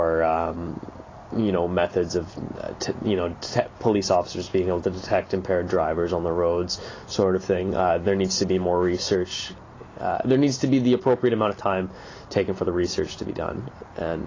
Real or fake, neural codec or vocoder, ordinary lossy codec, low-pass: real; none; AAC, 32 kbps; 7.2 kHz